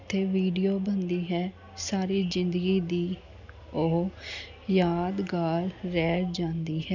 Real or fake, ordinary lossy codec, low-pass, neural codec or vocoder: real; none; 7.2 kHz; none